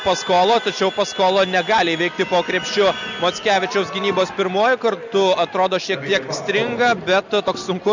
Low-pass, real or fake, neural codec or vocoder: 7.2 kHz; real; none